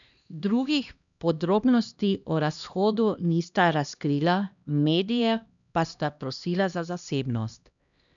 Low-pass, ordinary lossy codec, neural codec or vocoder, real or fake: 7.2 kHz; none; codec, 16 kHz, 1 kbps, X-Codec, HuBERT features, trained on LibriSpeech; fake